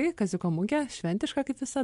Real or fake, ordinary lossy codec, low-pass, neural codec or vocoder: real; MP3, 64 kbps; 10.8 kHz; none